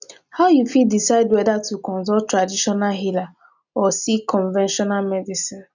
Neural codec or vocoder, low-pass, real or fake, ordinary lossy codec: none; 7.2 kHz; real; none